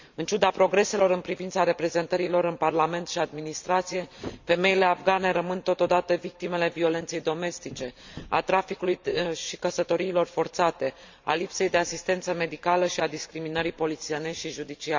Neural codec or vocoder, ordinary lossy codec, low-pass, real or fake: vocoder, 44.1 kHz, 128 mel bands every 512 samples, BigVGAN v2; MP3, 64 kbps; 7.2 kHz; fake